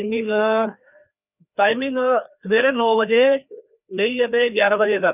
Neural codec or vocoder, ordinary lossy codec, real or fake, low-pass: codec, 16 kHz, 2 kbps, FreqCodec, larger model; none; fake; 3.6 kHz